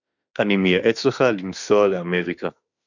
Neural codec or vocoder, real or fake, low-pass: autoencoder, 48 kHz, 32 numbers a frame, DAC-VAE, trained on Japanese speech; fake; 7.2 kHz